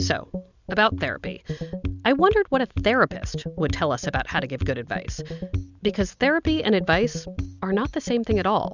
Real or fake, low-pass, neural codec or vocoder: real; 7.2 kHz; none